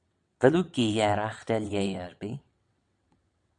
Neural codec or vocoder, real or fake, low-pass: vocoder, 22.05 kHz, 80 mel bands, WaveNeXt; fake; 9.9 kHz